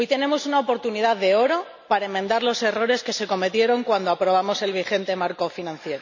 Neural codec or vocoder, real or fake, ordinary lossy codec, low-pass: none; real; none; 7.2 kHz